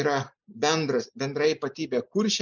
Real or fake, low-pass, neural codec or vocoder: real; 7.2 kHz; none